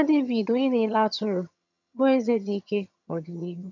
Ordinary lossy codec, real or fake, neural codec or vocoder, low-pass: none; fake; vocoder, 22.05 kHz, 80 mel bands, HiFi-GAN; 7.2 kHz